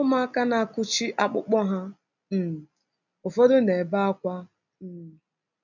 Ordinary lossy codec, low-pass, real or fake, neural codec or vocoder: none; 7.2 kHz; real; none